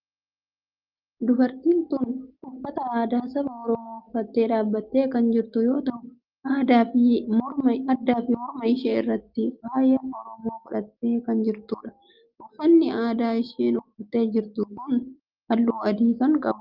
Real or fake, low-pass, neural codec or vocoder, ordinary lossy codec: real; 5.4 kHz; none; Opus, 32 kbps